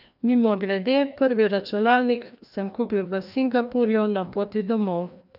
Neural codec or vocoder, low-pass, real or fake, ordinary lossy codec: codec, 16 kHz, 1 kbps, FreqCodec, larger model; 5.4 kHz; fake; none